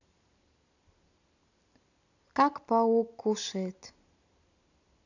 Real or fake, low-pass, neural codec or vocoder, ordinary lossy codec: real; 7.2 kHz; none; none